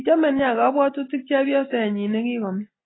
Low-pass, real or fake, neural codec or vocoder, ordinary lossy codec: 7.2 kHz; real; none; AAC, 16 kbps